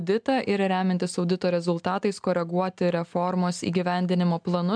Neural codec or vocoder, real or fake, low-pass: none; real; 9.9 kHz